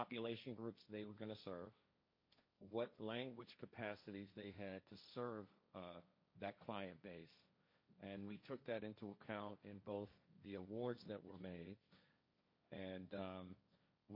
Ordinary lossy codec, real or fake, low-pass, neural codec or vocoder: MP3, 32 kbps; fake; 5.4 kHz; codec, 16 kHz, 1.1 kbps, Voila-Tokenizer